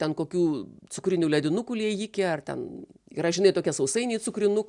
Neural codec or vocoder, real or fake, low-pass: none; real; 10.8 kHz